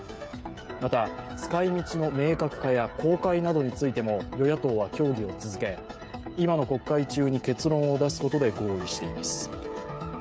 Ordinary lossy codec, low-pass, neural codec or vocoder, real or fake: none; none; codec, 16 kHz, 16 kbps, FreqCodec, smaller model; fake